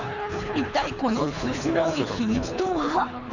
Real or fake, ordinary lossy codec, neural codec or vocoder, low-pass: fake; none; codec, 24 kHz, 3 kbps, HILCodec; 7.2 kHz